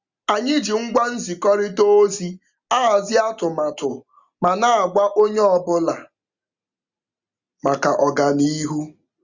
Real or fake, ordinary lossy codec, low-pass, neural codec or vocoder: real; Opus, 64 kbps; 7.2 kHz; none